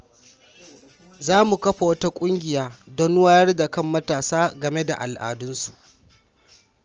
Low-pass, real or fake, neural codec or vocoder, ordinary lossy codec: 7.2 kHz; real; none; Opus, 24 kbps